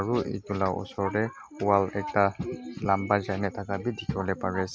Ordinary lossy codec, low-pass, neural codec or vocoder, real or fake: none; none; none; real